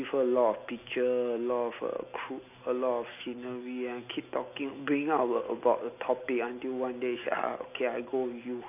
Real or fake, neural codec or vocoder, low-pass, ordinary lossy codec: real; none; 3.6 kHz; none